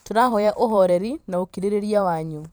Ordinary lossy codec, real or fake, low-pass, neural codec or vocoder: none; fake; none; vocoder, 44.1 kHz, 128 mel bands every 512 samples, BigVGAN v2